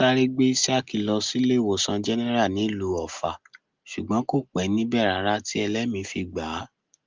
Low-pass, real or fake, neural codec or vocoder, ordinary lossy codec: 7.2 kHz; real; none; Opus, 32 kbps